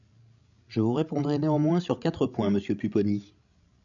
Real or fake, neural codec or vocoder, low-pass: fake; codec, 16 kHz, 16 kbps, FreqCodec, larger model; 7.2 kHz